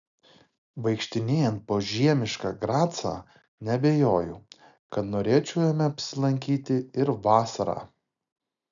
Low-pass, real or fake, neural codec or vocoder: 7.2 kHz; real; none